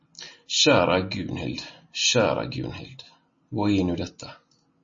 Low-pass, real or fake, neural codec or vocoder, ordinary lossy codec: 7.2 kHz; real; none; MP3, 32 kbps